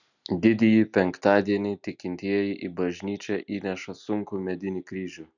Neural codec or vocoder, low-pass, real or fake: none; 7.2 kHz; real